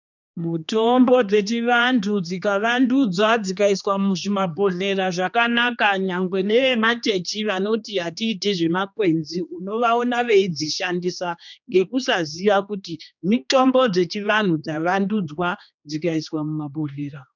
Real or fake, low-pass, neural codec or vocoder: fake; 7.2 kHz; codec, 16 kHz, 2 kbps, X-Codec, HuBERT features, trained on general audio